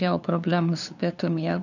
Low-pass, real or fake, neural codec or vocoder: 7.2 kHz; fake; codec, 16 kHz, 2 kbps, FunCodec, trained on LibriTTS, 25 frames a second